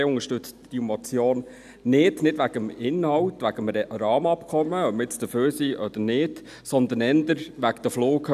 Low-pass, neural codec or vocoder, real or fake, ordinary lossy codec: 14.4 kHz; none; real; none